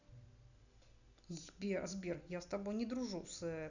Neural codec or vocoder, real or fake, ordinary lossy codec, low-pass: none; real; none; 7.2 kHz